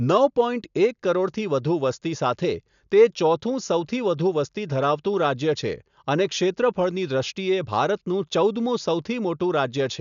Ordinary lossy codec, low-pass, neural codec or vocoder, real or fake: none; 7.2 kHz; none; real